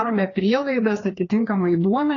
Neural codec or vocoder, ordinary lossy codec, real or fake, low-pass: codec, 16 kHz, 2 kbps, FreqCodec, larger model; AAC, 32 kbps; fake; 7.2 kHz